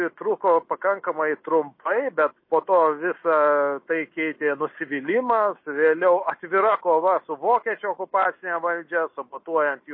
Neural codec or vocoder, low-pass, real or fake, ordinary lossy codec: none; 5.4 kHz; real; MP3, 24 kbps